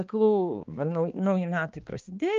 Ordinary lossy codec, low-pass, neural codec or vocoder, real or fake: Opus, 24 kbps; 7.2 kHz; codec, 16 kHz, 2 kbps, X-Codec, HuBERT features, trained on balanced general audio; fake